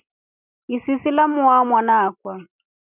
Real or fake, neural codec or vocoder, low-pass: real; none; 3.6 kHz